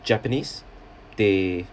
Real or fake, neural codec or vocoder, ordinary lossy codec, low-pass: real; none; none; none